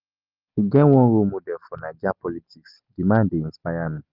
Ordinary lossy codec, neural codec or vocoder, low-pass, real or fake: Opus, 32 kbps; none; 5.4 kHz; real